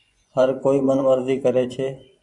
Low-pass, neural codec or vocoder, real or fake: 10.8 kHz; vocoder, 24 kHz, 100 mel bands, Vocos; fake